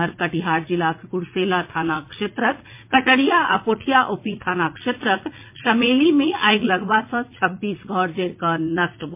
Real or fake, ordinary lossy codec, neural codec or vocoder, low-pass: fake; MP3, 24 kbps; vocoder, 44.1 kHz, 80 mel bands, Vocos; 3.6 kHz